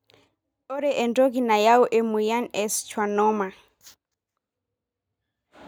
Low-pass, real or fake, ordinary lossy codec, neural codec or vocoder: none; real; none; none